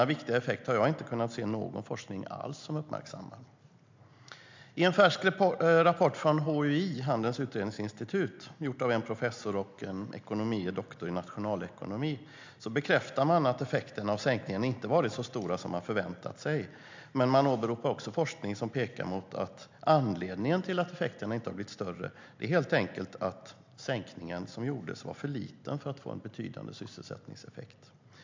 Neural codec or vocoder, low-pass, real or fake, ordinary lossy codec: none; 7.2 kHz; real; MP3, 64 kbps